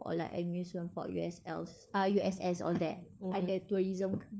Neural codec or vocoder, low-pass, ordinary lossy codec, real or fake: codec, 16 kHz, 4 kbps, FunCodec, trained on LibriTTS, 50 frames a second; none; none; fake